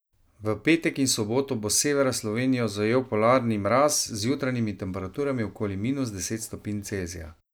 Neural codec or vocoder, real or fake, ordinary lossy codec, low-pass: none; real; none; none